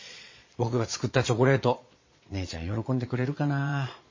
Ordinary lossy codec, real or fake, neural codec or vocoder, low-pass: MP3, 32 kbps; fake; vocoder, 22.05 kHz, 80 mel bands, Vocos; 7.2 kHz